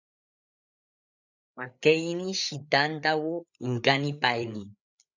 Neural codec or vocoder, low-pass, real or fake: codec, 16 kHz, 8 kbps, FreqCodec, larger model; 7.2 kHz; fake